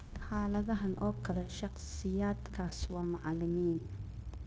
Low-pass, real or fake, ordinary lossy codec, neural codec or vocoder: none; fake; none; codec, 16 kHz, 0.9 kbps, LongCat-Audio-Codec